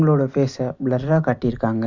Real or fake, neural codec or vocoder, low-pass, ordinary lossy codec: real; none; 7.2 kHz; Opus, 64 kbps